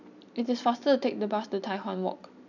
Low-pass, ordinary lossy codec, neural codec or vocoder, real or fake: 7.2 kHz; none; none; real